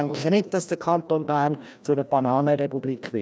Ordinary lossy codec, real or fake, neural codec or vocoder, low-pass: none; fake; codec, 16 kHz, 1 kbps, FreqCodec, larger model; none